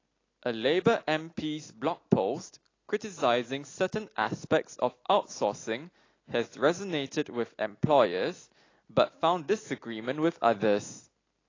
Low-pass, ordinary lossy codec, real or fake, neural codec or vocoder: 7.2 kHz; AAC, 32 kbps; real; none